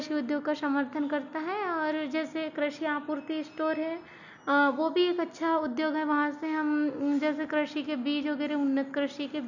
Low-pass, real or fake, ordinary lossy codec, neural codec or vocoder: 7.2 kHz; real; none; none